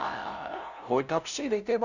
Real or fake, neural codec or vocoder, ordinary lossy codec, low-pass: fake; codec, 16 kHz, 0.5 kbps, FunCodec, trained on LibriTTS, 25 frames a second; none; 7.2 kHz